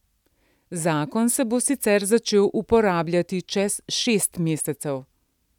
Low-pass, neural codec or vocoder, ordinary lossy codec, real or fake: 19.8 kHz; none; none; real